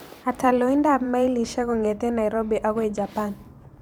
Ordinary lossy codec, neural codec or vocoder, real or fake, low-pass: none; vocoder, 44.1 kHz, 128 mel bands every 512 samples, BigVGAN v2; fake; none